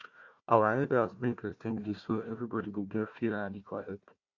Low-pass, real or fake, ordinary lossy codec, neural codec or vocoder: 7.2 kHz; fake; Opus, 64 kbps; codec, 16 kHz, 1 kbps, FunCodec, trained on Chinese and English, 50 frames a second